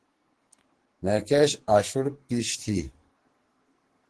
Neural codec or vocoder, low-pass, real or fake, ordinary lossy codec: codec, 44.1 kHz, 2.6 kbps, SNAC; 10.8 kHz; fake; Opus, 16 kbps